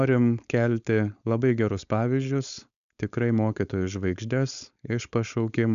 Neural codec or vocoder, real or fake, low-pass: codec, 16 kHz, 4.8 kbps, FACodec; fake; 7.2 kHz